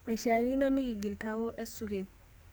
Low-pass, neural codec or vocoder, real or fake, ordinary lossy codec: none; codec, 44.1 kHz, 2.6 kbps, SNAC; fake; none